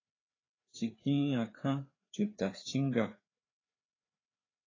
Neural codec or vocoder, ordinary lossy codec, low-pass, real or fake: codec, 16 kHz, 4 kbps, FreqCodec, larger model; AAC, 32 kbps; 7.2 kHz; fake